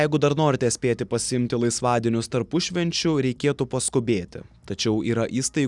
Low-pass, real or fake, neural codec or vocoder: 10.8 kHz; real; none